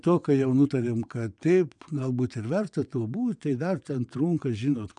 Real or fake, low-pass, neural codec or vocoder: fake; 9.9 kHz; vocoder, 22.05 kHz, 80 mel bands, WaveNeXt